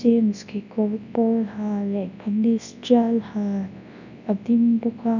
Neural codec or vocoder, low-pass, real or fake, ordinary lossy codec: codec, 24 kHz, 0.9 kbps, WavTokenizer, large speech release; 7.2 kHz; fake; none